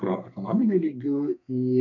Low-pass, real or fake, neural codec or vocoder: 7.2 kHz; fake; codec, 32 kHz, 1.9 kbps, SNAC